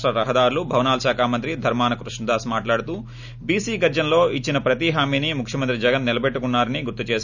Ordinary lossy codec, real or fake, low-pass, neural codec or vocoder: none; real; 7.2 kHz; none